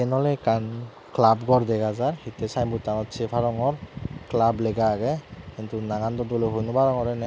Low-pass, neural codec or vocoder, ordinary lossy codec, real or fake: none; none; none; real